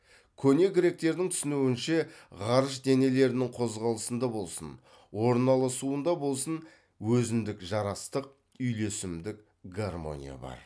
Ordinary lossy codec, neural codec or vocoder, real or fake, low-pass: none; none; real; none